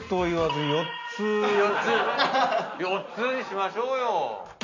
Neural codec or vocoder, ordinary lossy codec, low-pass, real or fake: none; none; 7.2 kHz; real